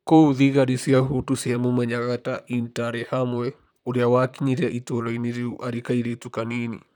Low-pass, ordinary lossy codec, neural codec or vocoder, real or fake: 19.8 kHz; none; vocoder, 44.1 kHz, 128 mel bands, Pupu-Vocoder; fake